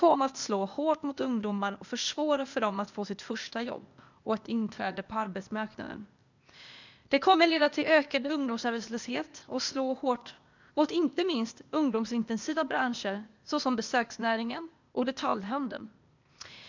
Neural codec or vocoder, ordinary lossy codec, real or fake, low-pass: codec, 16 kHz, 0.8 kbps, ZipCodec; none; fake; 7.2 kHz